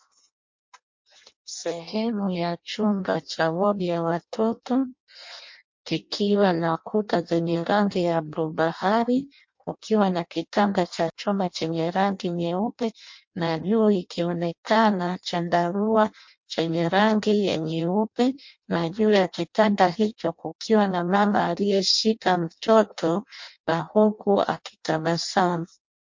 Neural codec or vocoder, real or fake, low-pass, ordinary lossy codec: codec, 16 kHz in and 24 kHz out, 0.6 kbps, FireRedTTS-2 codec; fake; 7.2 kHz; MP3, 48 kbps